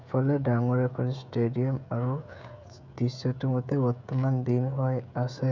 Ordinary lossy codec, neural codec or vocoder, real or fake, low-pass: none; codec, 16 kHz, 16 kbps, FreqCodec, smaller model; fake; 7.2 kHz